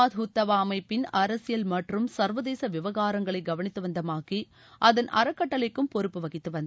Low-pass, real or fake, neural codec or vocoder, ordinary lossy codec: none; real; none; none